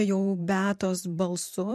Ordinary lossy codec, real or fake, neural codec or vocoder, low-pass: MP3, 64 kbps; fake; vocoder, 44.1 kHz, 128 mel bands every 512 samples, BigVGAN v2; 14.4 kHz